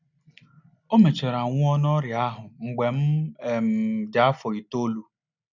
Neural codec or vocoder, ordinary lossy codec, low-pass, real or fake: none; none; 7.2 kHz; real